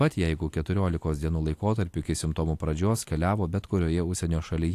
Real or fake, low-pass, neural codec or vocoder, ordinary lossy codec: real; 14.4 kHz; none; AAC, 64 kbps